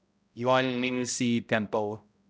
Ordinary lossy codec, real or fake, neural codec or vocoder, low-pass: none; fake; codec, 16 kHz, 0.5 kbps, X-Codec, HuBERT features, trained on balanced general audio; none